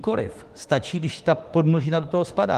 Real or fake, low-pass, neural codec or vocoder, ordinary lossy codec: fake; 14.4 kHz; autoencoder, 48 kHz, 32 numbers a frame, DAC-VAE, trained on Japanese speech; Opus, 24 kbps